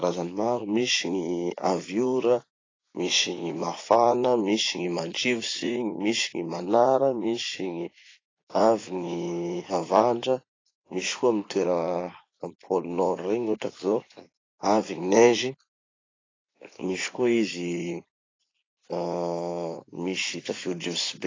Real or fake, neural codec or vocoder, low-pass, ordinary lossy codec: fake; vocoder, 44.1 kHz, 80 mel bands, Vocos; 7.2 kHz; AAC, 32 kbps